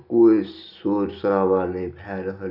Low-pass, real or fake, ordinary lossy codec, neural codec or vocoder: 5.4 kHz; real; none; none